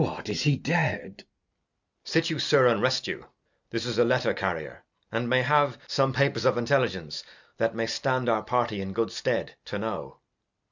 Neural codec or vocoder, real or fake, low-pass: none; real; 7.2 kHz